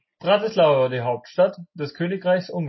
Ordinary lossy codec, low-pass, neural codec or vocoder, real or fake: MP3, 24 kbps; 7.2 kHz; none; real